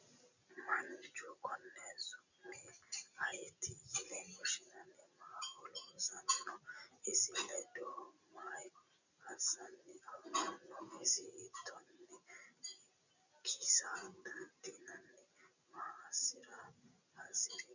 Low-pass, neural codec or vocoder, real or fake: 7.2 kHz; none; real